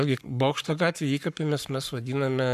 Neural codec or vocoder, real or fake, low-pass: codec, 44.1 kHz, 7.8 kbps, Pupu-Codec; fake; 14.4 kHz